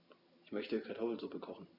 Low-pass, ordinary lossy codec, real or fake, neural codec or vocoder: 5.4 kHz; Opus, 64 kbps; real; none